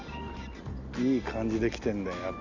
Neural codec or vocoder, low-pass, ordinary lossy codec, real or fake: none; 7.2 kHz; Opus, 64 kbps; real